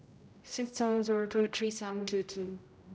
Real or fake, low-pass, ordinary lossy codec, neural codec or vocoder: fake; none; none; codec, 16 kHz, 0.5 kbps, X-Codec, HuBERT features, trained on general audio